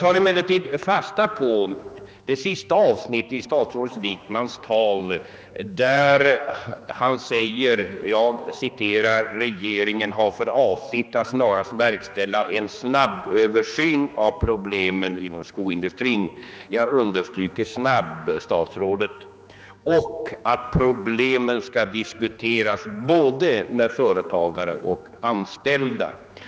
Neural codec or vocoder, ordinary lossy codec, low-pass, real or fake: codec, 16 kHz, 2 kbps, X-Codec, HuBERT features, trained on general audio; none; none; fake